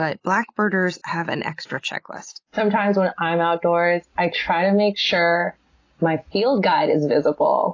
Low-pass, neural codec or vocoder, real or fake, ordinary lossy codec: 7.2 kHz; none; real; AAC, 32 kbps